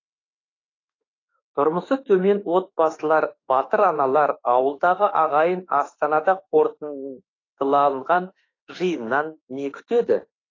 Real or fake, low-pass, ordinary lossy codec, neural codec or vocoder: fake; 7.2 kHz; AAC, 32 kbps; autoencoder, 48 kHz, 32 numbers a frame, DAC-VAE, trained on Japanese speech